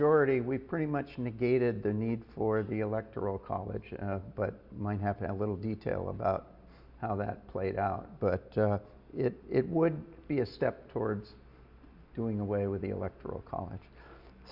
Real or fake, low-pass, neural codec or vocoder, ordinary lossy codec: real; 5.4 kHz; none; Opus, 64 kbps